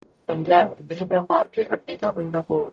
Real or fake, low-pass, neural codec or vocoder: fake; 9.9 kHz; codec, 44.1 kHz, 0.9 kbps, DAC